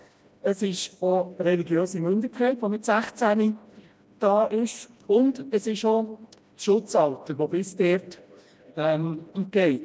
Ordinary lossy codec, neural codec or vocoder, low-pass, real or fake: none; codec, 16 kHz, 1 kbps, FreqCodec, smaller model; none; fake